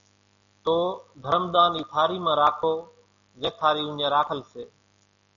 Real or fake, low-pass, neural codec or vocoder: real; 7.2 kHz; none